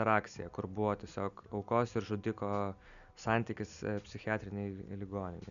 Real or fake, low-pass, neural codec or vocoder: real; 7.2 kHz; none